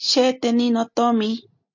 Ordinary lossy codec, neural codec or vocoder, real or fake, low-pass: MP3, 48 kbps; none; real; 7.2 kHz